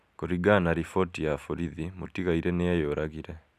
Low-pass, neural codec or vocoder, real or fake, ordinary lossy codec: 14.4 kHz; none; real; none